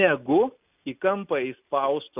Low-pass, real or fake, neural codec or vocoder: 3.6 kHz; real; none